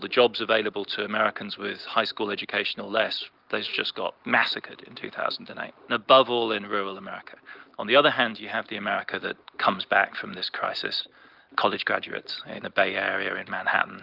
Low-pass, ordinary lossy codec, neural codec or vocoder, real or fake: 5.4 kHz; Opus, 32 kbps; none; real